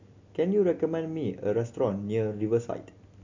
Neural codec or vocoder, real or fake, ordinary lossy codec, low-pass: none; real; MP3, 64 kbps; 7.2 kHz